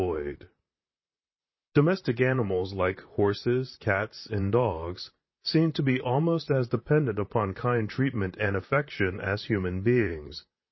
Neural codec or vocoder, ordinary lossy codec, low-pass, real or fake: vocoder, 44.1 kHz, 128 mel bands, Pupu-Vocoder; MP3, 24 kbps; 7.2 kHz; fake